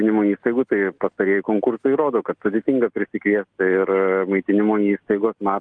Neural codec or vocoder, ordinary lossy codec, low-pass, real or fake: none; Opus, 32 kbps; 9.9 kHz; real